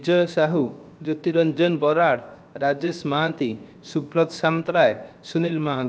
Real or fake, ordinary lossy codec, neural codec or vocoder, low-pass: fake; none; codec, 16 kHz, 0.7 kbps, FocalCodec; none